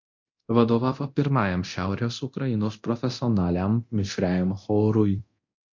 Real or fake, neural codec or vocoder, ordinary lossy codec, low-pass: fake; codec, 24 kHz, 0.9 kbps, DualCodec; MP3, 48 kbps; 7.2 kHz